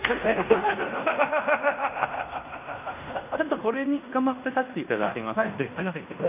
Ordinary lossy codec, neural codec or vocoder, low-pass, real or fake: none; codec, 16 kHz in and 24 kHz out, 0.9 kbps, LongCat-Audio-Codec, fine tuned four codebook decoder; 3.6 kHz; fake